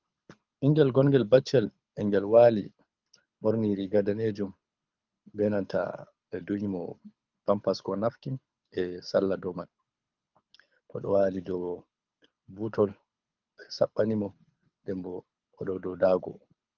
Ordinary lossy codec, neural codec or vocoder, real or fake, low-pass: Opus, 24 kbps; codec, 24 kHz, 6 kbps, HILCodec; fake; 7.2 kHz